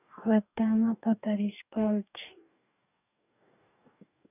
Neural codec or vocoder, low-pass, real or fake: codec, 44.1 kHz, 2.6 kbps, DAC; 3.6 kHz; fake